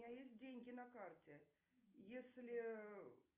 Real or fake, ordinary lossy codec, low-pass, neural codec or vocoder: real; Opus, 32 kbps; 3.6 kHz; none